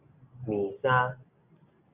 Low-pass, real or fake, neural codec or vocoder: 3.6 kHz; real; none